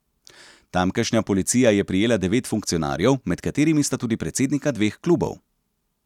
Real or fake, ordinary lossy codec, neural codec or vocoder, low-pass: real; none; none; 19.8 kHz